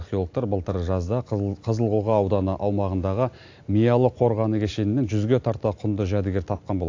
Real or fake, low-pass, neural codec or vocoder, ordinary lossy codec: real; 7.2 kHz; none; AAC, 48 kbps